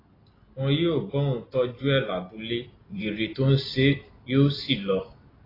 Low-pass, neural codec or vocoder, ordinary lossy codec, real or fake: 5.4 kHz; none; AAC, 24 kbps; real